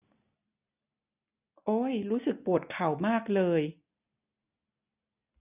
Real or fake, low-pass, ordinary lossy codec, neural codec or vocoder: real; 3.6 kHz; none; none